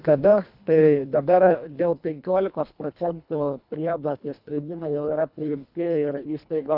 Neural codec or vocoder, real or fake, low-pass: codec, 24 kHz, 1.5 kbps, HILCodec; fake; 5.4 kHz